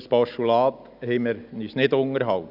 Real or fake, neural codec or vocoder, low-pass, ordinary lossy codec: real; none; 5.4 kHz; none